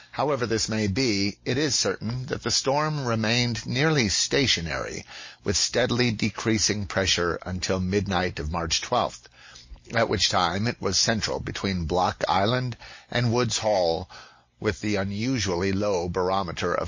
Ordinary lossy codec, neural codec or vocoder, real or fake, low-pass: MP3, 32 kbps; none; real; 7.2 kHz